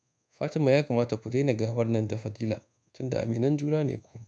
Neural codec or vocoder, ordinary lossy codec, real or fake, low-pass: codec, 24 kHz, 1.2 kbps, DualCodec; none; fake; 9.9 kHz